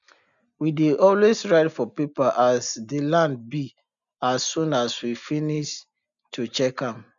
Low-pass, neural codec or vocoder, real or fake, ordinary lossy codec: 7.2 kHz; none; real; none